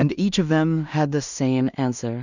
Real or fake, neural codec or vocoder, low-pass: fake; codec, 16 kHz in and 24 kHz out, 0.4 kbps, LongCat-Audio-Codec, two codebook decoder; 7.2 kHz